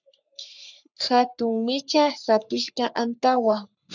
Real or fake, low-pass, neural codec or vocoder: fake; 7.2 kHz; codec, 44.1 kHz, 3.4 kbps, Pupu-Codec